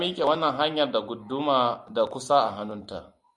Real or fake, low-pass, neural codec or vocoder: real; 10.8 kHz; none